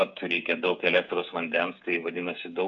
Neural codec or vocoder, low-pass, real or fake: codec, 16 kHz, 8 kbps, FreqCodec, smaller model; 7.2 kHz; fake